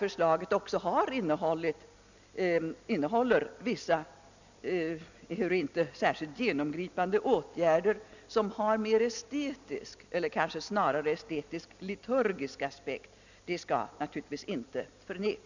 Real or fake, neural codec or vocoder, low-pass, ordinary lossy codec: real; none; 7.2 kHz; none